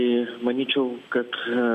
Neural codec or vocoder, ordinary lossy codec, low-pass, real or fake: none; MP3, 96 kbps; 14.4 kHz; real